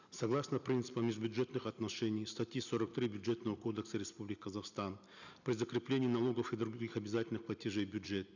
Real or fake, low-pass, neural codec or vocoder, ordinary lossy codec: real; 7.2 kHz; none; none